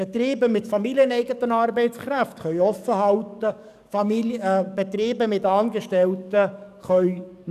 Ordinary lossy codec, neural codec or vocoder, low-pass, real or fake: none; codec, 44.1 kHz, 7.8 kbps, DAC; 14.4 kHz; fake